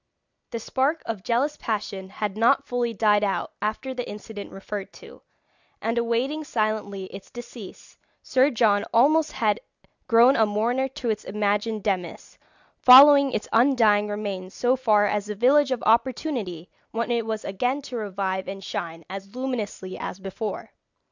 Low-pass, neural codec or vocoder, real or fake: 7.2 kHz; none; real